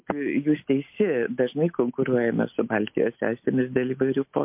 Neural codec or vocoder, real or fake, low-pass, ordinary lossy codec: none; real; 3.6 kHz; MP3, 32 kbps